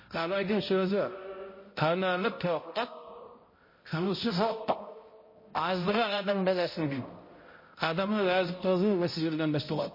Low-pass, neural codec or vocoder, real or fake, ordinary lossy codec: 5.4 kHz; codec, 16 kHz, 0.5 kbps, X-Codec, HuBERT features, trained on balanced general audio; fake; MP3, 24 kbps